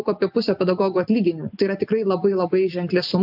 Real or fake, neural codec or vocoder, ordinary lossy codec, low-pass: real; none; AAC, 48 kbps; 5.4 kHz